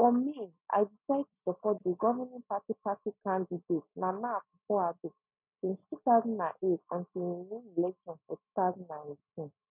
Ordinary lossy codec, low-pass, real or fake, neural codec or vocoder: none; 3.6 kHz; real; none